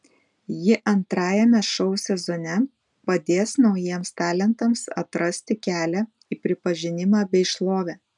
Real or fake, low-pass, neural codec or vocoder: real; 10.8 kHz; none